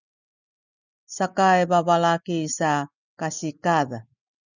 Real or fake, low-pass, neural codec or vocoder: real; 7.2 kHz; none